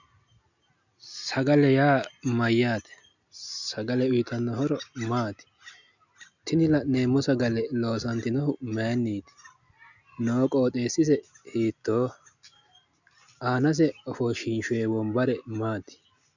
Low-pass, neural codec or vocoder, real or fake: 7.2 kHz; none; real